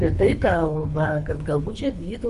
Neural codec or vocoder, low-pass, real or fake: codec, 24 kHz, 3 kbps, HILCodec; 10.8 kHz; fake